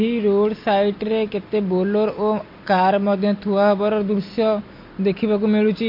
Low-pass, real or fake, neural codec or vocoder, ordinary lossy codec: 5.4 kHz; real; none; MP3, 32 kbps